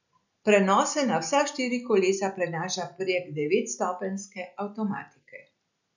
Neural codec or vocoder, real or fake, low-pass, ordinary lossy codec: none; real; 7.2 kHz; none